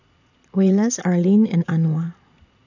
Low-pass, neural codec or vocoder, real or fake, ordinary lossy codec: 7.2 kHz; vocoder, 22.05 kHz, 80 mel bands, Vocos; fake; none